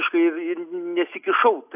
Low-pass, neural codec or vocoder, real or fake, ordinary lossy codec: 3.6 kHz; none; real; AAC, 32 kbps